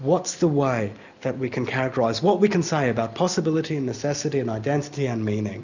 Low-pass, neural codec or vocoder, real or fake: 7.2 kHz; none; real